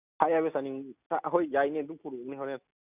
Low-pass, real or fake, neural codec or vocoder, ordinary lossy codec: 3.6 kHz; real; none; none